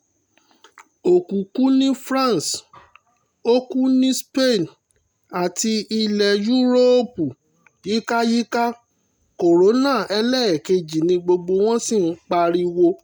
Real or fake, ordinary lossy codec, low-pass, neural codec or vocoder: real; none; none; none